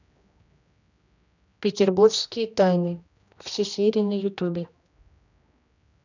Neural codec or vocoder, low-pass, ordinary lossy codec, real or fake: codec, 16 kHz, 1 kbps, X-Codec, HuBERT features, trained on general audio; 7.2 kHz; none; fake